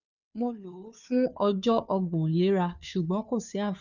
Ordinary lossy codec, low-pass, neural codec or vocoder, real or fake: none; 7.2 kHz; codec, 16 kHz, 2 kbps, FunCodec, trained on Chinese and English, 25 frames a second; fake